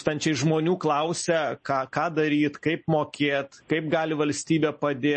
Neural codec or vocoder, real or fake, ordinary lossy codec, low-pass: none; real; MP3, 32 kbps; 10.8 kHz